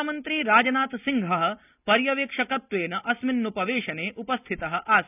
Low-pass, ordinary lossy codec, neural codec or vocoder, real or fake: 3.6 kHz; none; none; real